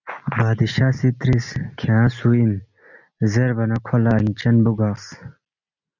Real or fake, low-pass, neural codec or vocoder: real; 7.2 kHz; none